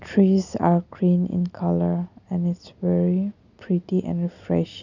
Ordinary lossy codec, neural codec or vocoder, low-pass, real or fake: none; none; 7.2 kHz; real